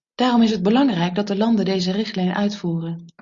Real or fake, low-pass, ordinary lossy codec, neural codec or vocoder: real; 7.2 kHz; Opus, 64 kbps; none